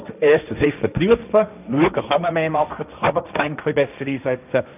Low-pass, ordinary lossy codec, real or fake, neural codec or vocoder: 3.6 kHz; none; fake; codec, 16 kHz, 1.1 kbps, Voila-Tokenizer